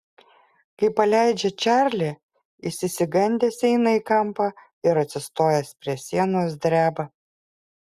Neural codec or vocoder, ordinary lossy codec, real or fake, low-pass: none; Opus, 64 kbps; real; 14.4 kHz